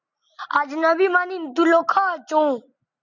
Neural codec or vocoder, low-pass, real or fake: none; 7.2 kHz; real